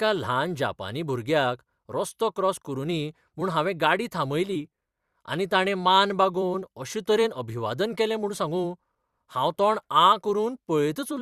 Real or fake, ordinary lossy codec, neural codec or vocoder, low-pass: fake; Opus, 64 kbps; vocoder, 44.1 kHz, 128 mel bands every 256 samples, BigVGAN v2; 14.4 kHz